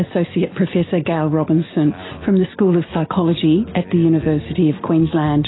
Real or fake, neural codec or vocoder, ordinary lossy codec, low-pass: real; none; AAC, 16 kbps; 7.2 kHz